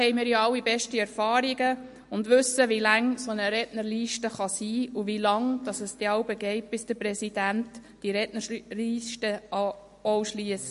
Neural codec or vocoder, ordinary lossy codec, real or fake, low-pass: none; MP3, 48 kbps; real; 14.4 kHz